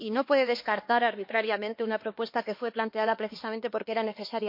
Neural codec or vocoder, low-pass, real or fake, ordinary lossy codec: codec, 16 kHz, 2 kbps, X-Codec, HuBERT features, trained on LibriSpeech; 5.4 kHz; fake; MP3, 32 kbps